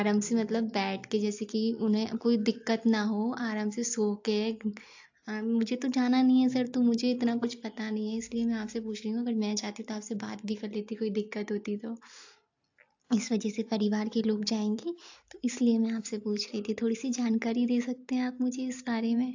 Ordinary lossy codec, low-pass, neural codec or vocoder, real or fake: AAC, 48 kbps; 7.2 kHz; none; real